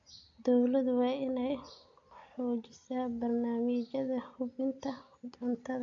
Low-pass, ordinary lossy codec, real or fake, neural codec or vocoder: 7.2 kHz; AAC, 64 kbps; real; none